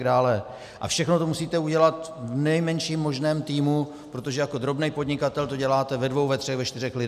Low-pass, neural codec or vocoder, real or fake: 14.4 kHz; none; real